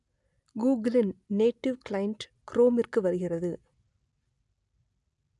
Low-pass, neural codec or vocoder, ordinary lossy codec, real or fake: 10.8 kHz; none; none; real